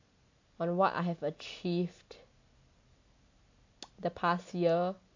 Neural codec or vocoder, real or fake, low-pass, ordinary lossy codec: none; real; 7.2 kHz; AAC, 48 kbps